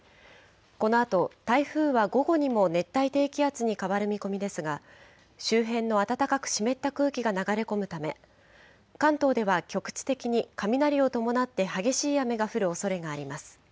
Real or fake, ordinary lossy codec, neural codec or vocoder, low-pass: real; none; none; none